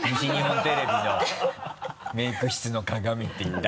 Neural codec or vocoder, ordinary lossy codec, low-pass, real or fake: none; none; none; real